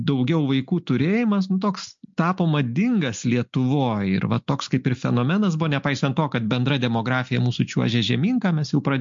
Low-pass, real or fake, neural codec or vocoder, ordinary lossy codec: 7.2 kHz; real; none; MP3, 48 kbps